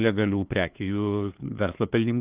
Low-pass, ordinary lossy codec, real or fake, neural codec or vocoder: 3.6 kHz; Opus, 32 kbps; fake; codec, 16 kHz, 2 kbps, FunCodec, trained on LibriTTS, 25 frames a second